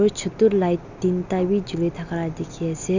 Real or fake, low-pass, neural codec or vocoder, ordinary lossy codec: real; 7.2 kHz; none; none